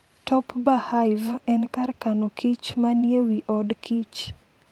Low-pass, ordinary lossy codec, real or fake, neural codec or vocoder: 19.8 kHz; Opus, 32 kbps; fake; vocoder, 44.1 kHz, 128 mel bands every 512 samples, BigVGAN v2